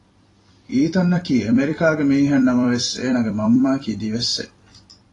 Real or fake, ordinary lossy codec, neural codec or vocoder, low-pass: fake; AAC, 32 kbps; vocoder, 44.1 kHz, 128 mel bands every 256 samples, BigVGAN v2; 10.8 kHz